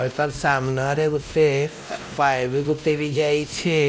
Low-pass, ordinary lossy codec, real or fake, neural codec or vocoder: none; none; fake; codec, 16 kHz, 1 kbps, X-Codec, WavLM features, trained on Multilingual LibriSpeech